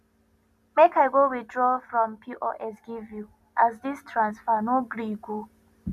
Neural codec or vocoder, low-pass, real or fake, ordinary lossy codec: none; 14.4 kHz; real; none